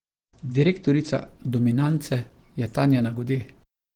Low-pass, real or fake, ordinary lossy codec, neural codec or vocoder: 19.8 kHz; fake; Opus, 16 kbps; vocoder, 44.1 kHz, 128 mel bands every 512 samples, BigVGAN v2